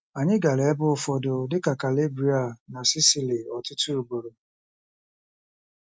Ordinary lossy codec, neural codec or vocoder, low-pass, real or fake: none; none; none; real